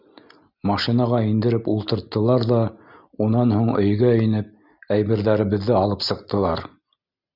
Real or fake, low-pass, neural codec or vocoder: real; 5.4 kHz; none